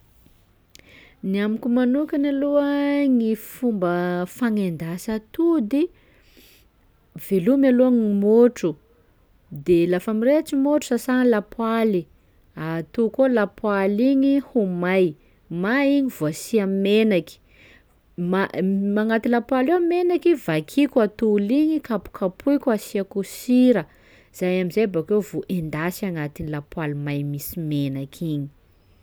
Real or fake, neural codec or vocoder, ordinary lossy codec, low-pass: real; none; none; none